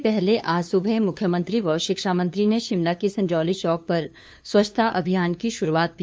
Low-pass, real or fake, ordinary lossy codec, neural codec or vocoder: none; fake; none; codec, 16 kHz, 2 kbps, FunCodec, trained on LibriTTS, 25 frames a second